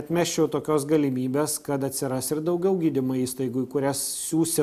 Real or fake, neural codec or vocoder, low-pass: real; none; 14.4 kHz